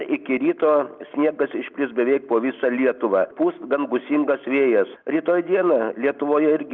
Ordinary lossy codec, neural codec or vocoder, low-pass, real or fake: Opus, 24 kbps; none; 7.2 kHz; real